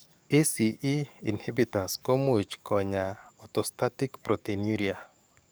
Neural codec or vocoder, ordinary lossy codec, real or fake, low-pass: codec, 44.1 kHz, 7.8 kbps, DAC; none; fake; none